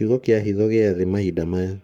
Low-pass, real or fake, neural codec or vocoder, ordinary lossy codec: 19.8 kHz; fake; codec, 44.1 kHz, 7.8 kbps, Pupu-Codec; none